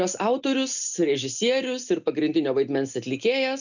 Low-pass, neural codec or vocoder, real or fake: 7.2 kHz; none; real